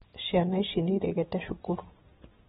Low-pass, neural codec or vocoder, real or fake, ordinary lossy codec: 19.8 kHz; none; real; AAC, 16 kbps